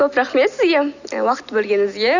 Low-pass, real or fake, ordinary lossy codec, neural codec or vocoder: 7.2 kHz; real; none; none